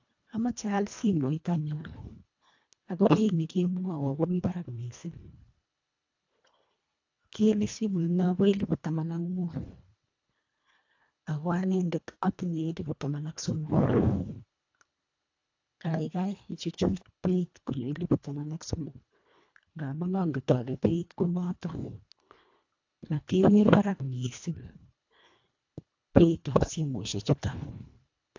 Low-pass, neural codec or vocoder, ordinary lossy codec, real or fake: 7.2 kHz; codec, 24 kHz, 1.5 kbps, HILCodec; AAC, 48 kbps; fake